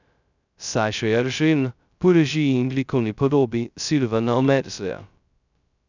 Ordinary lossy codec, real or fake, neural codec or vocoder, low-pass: none; fake; codec, 16 kHz, 0.2 kbps, FocalCodec; 7.2 kHz